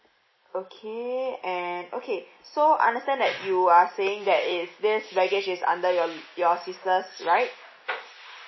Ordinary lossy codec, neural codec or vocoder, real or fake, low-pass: MP3, 24 kbps; none; real; 7.2 kHz